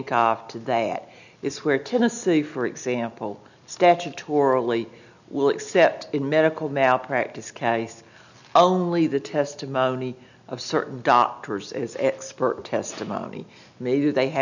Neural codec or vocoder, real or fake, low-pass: none; real; 7.2 kHz